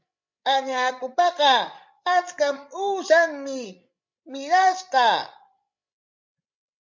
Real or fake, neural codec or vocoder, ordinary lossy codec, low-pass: fake; codec, 16 kHz, 16 kbps, FreqCodec, larger model; MP3, 48 kbps; 7.2 kHz